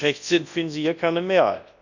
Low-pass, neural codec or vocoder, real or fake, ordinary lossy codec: 7.2 kHz; codec, 24 kHz, 0.9 kbps, WavTokenizer, large speech release; fake; none